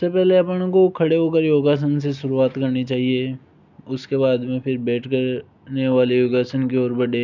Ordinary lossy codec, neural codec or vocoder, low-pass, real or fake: none; none; 7.2 kHz; real